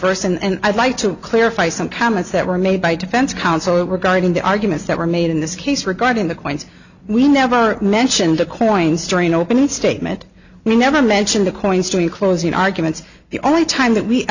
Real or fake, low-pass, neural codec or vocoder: real; 7.2 kHz; none